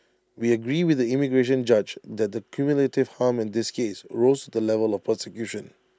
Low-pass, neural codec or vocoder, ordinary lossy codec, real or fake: none; none; none; real